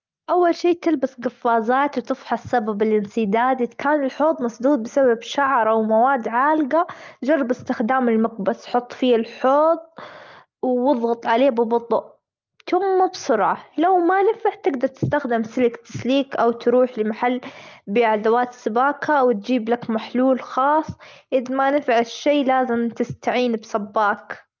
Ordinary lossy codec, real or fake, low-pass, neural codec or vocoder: Opus, 24 kbps; real; 7.2 kHz; none